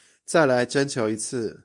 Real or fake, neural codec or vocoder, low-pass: fake; codec, 24 kHz, 0.9 kbps, WavTokenizer, medium speech release version 2; 10.8 kHz